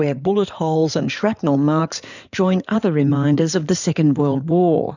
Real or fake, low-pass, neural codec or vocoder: fake; 7.2 kHz; codec, 16 kHz in and 24 kHz out, 2.2 kbps, FireRedTTS-2 codec